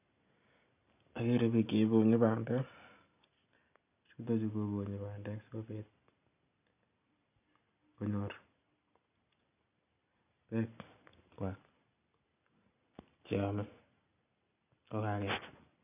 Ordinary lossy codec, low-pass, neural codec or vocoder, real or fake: none; 3.6 kHz; none; real